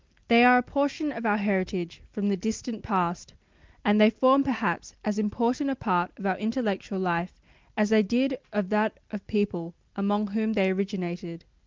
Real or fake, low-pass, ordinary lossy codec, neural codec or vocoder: real; 7.2 kHz; Opus, 32 kbps; none